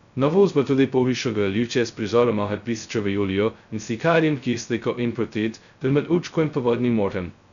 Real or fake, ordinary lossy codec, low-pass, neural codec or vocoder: fake; none; 7.2 kHz; codec, 16 kHz, 0.2 kbps, FocalCodec